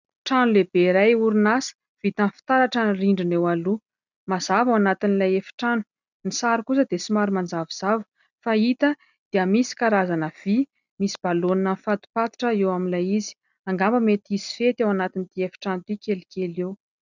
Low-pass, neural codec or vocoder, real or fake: 7.2 kHz; none; real